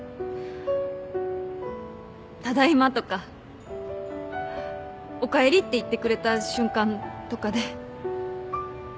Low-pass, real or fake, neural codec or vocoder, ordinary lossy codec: none; real; none; none